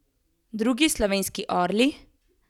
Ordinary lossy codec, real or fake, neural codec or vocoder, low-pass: none; real; none; 19.8 kHz